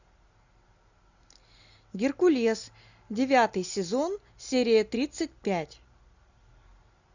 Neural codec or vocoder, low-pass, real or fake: none; 7.2 kHz; real